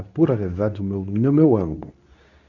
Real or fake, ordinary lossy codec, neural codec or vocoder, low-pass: fake; none; codec, 24 kHz, 0.9 kbps, WavTokenizer, medium speech release version 2; 7.2 kHz